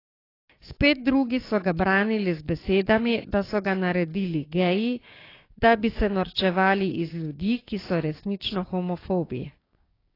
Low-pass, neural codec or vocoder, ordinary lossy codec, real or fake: 5.4 kHz; codec, 16 kHz, 6 kbps, DAC; AAC, 24 kbps; fake